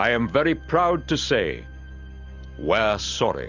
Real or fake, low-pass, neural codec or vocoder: real; 7.2 kHz; none